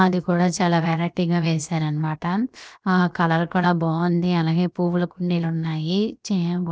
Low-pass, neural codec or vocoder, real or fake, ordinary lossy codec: none; codec, 16 kHz, 0.7 kbps, FocalCodec; fake; none